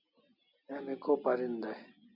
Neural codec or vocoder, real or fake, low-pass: none; real; 5.4 kHz